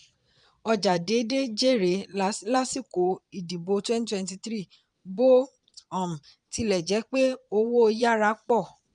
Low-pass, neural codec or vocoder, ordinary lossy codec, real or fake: 9.9 kHz; vocoder, 22.05 kHz, 80 mel bands, Vocos; none; fake